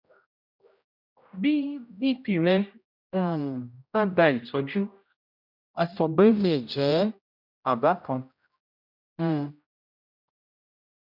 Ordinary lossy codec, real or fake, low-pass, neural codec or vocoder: none; fake; 5.4 kHz; codec, 16 kHz, 0.5 kbps, X-Codec, HuBERT features, trained on general audio